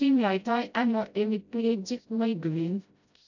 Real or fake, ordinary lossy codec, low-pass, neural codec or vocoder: fake; none; 7.2 kHz; codec, 16 kHz, 0.5 kbps, FreqCodec, smaller model